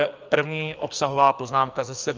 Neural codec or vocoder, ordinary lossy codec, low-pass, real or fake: codec, 44.1 kHz, 2.6 kbps, SNAC; Opus, 24 kbps; 7.2 kHz; fake